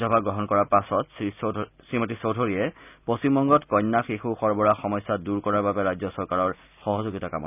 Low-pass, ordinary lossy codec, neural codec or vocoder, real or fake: 3.6 kHz; none; none; real